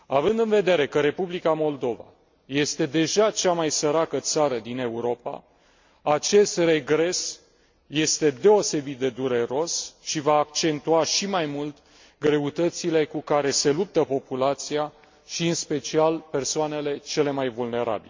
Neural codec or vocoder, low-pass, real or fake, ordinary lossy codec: none; 7.2 kHz; real; MP3, 48 kbps